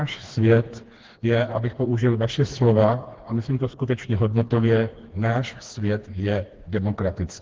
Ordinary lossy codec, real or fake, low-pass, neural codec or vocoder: Opus, 16 kbps; fake; 7.2 kHz; codec, 16 kHz, 2 kbps, FreqCodec, smaller model